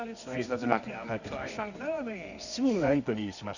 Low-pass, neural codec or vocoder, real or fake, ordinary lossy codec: 7.2 kHz; codec, 24 kHz, 0.9 kbps, WavTokenizer, medium music audio release; fake; none